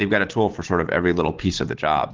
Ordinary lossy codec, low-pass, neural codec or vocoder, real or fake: Opus, 16 kbps; 7.2 kHz; none; real